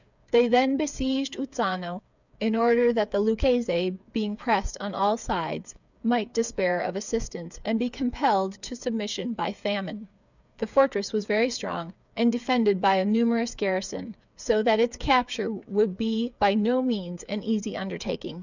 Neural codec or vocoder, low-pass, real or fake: codec, 16 kHz, 8 kbps, FreqCodec, smaller model; 7.2 kHz; fake